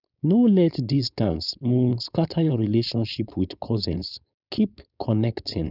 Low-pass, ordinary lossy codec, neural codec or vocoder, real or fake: 5.4 kHz; none; codec, 16 kHz, 4.8 kbps, FACodec; fake